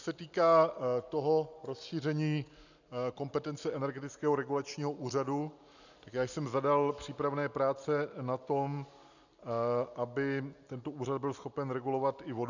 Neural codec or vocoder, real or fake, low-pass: none; real; 7.2 kHz